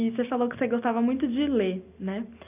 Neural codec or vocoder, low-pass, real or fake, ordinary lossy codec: none; 3.6 kHz; real; none